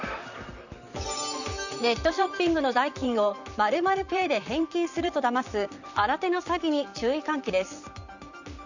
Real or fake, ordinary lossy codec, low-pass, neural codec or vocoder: fake; none; 7.2 kHz; vocoder, 44.1 kHz, 128 mel bands, Pupu-Vocoder